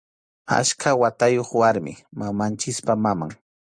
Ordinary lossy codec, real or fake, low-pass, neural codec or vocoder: Opus, 64 kbps; real; 9.9 kHz; none